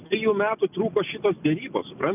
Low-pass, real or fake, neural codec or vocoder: 3.6 kHz; real; none